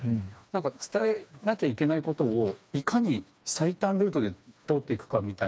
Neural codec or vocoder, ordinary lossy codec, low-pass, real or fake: codec, 16 kHz, 2 kbps, FreqCodec, smaller model; none; none; fake